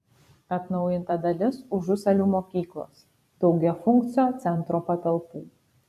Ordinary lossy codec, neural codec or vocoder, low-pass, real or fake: MP3, 96 kbps; vocoder, 44.1 kHz, 128 mel bands every 256 samples, BigVGAN v2; 14.4 kHz; fake